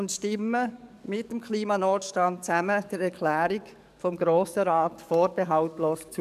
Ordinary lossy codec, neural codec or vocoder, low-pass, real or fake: none; codec, 44.1 kHz, 7.8 kbps, DAC; 14.4 kHz; fake